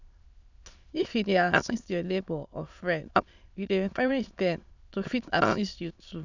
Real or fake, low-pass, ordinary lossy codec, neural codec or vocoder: fake; 7.2 kHz; none; autoencoder, 22.05 kHz, a latent of 192 numbers a frame, VITS, trained on many speakers